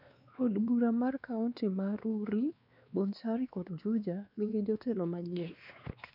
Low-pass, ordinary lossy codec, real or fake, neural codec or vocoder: 5.4 kHz; none; fake; codec, 16 kHz, 2 kbps, X-Codec, WavLM features, trained on Multilingual LibriSpeech